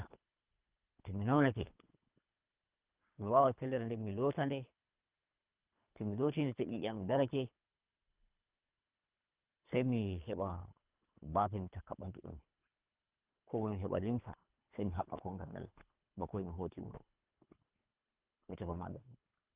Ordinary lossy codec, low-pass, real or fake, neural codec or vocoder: Opus, 32 kbps; 3.6 kHz; fake; codec, 24 kHz, 3 kbps, HILCodec